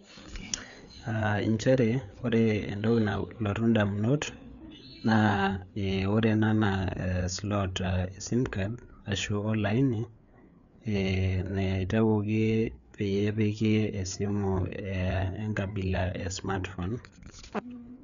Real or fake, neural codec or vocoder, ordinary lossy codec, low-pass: fake; codec, 16 kHz, 4 kbps, FreqCodec, larger model; none; 7.2 kHz